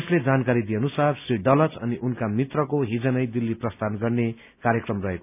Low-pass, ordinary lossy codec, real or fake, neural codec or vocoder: 3.6 kHz; none; real; none